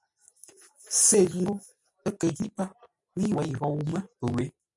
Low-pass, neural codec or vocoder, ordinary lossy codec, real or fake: 10.8 kHz; none; MP3, 96 kbps; real